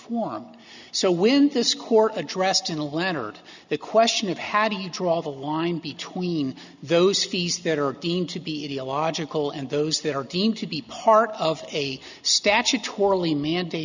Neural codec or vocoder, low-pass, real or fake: none; 7.2 kHz; real